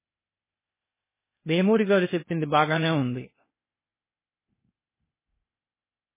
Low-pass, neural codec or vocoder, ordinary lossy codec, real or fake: 3.6 kHz; codec, 16 kHz, 0.8 kbps, ZipCodec; MP3, 16 kbps; fake